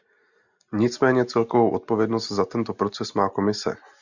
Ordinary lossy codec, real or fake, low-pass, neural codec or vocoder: Opus, 64 kbps; real; 7.2 kHz; none